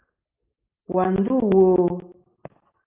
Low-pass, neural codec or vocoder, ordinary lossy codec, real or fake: 3.6 kHz; none; Opus, 32 kbps; real